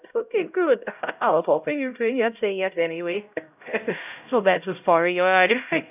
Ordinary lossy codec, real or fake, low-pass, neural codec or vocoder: none; fake; 3.6 kHz; codec, 16 kHz, 0.5 kbps, X-Codec, HuBERT features, trained on LibriSpeech